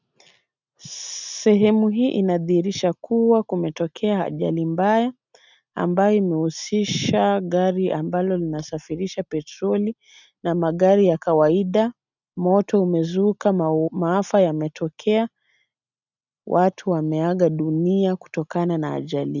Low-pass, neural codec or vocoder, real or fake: 7.2 kHz; none; real